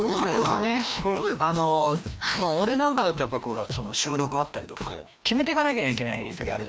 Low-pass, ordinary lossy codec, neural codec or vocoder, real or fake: none; none; codec, 16 kHz, 1 kbps, FreqCodec, larger model; fake